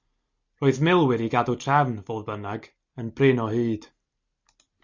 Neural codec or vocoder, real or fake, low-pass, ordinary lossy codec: none; real; 7.2 kHz; Opus, 64 kbps